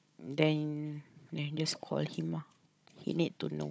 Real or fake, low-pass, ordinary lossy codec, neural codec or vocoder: fake; none; none; codec, 16 kHz, 16 kbps, FunCodec, trained on Chinese and English, 50 frames a second